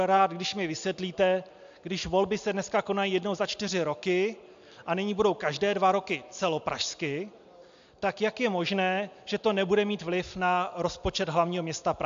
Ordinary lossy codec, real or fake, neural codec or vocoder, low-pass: AAC, 64 kbps; real; none; 7.2 kHz